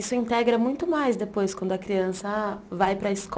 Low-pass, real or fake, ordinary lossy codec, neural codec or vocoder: none; real; none; none